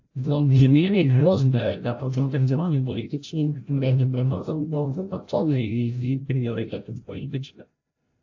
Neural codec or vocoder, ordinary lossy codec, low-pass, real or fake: codec, 16 kHz, 0.5 kbps, FreqCodec, larger model; AAC, 48 kbps; 7.2 kHz; fake